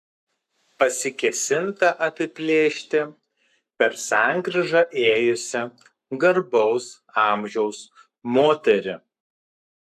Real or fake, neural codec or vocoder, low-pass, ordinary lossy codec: fake; codec, 44.1 kHz, 7.8 kbps, Pupu-Codec; 14.4 kHz; AAC, 96 kbps